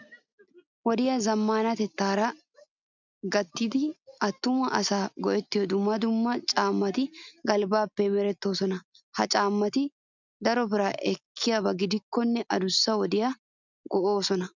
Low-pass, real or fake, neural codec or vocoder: 7.2 kHz; real; none